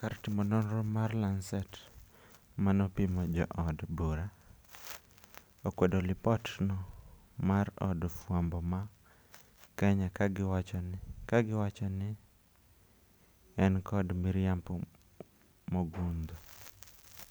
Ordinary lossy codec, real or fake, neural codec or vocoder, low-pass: none; real; none; none